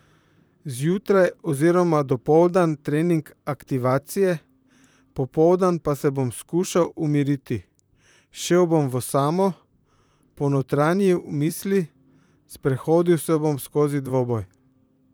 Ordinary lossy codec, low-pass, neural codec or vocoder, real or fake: none; none; vocoder, 44.1 kHz, 128 mel bands, Pupu-Vocoder; fake